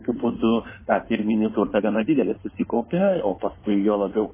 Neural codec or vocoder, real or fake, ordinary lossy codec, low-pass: codec, 16 kHz in and 24 kHz out, 2.2 kbps, FireRedTTS-2 codec; fake; MP3, 16 kbps; 3.6 kHz